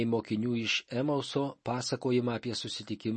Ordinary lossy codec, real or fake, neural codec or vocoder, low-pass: MP3, 32 kbps; real; none; 10.8 kHz